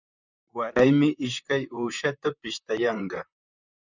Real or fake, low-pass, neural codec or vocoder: fake; 7.2 kHz; vocoder, 44.1 kHz, 128 mel bands, Pupu-Vocoder